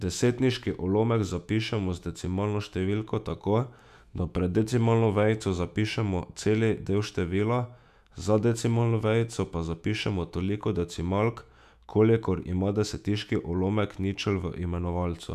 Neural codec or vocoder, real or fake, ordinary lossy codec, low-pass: autoencoder, 48 kHz, 128 numbers a frame, DAC-VAE, trained on Japanese speech; fake; none; 14.4 kHz